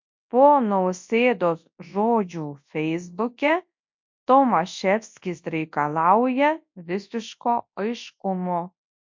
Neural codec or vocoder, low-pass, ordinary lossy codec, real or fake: codec, 24 kHz, 0.9 kbps, WavTokenizer, large speech release; 7.2 kHz; MP3, 48 kbps; fake